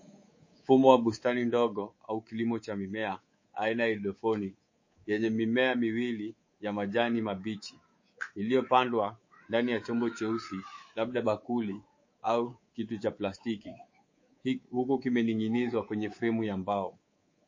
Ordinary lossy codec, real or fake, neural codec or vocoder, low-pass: MP3, 32 kbps; fake; codec, 24 kHz, 3.1 kbps, DualCodec; 7.2 kHz